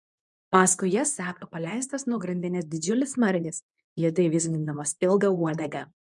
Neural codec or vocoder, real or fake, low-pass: codec, 24 kHz, 0.9 kbps, WavTokenizer, medium speech release version 2; fake; 10.8 kHz